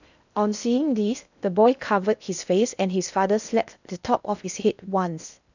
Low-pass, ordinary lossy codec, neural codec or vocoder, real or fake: 7.2 kHz; none; codec, 16 kHz in and 24 kHz out, 0.6 kbps, FocalCodec, streaming, 2048 codes; fake